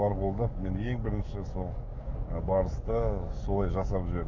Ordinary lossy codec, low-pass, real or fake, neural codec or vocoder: none; 7.2 kHz; fake; codec, 44.1 kHz, 7.8 kbps, DAC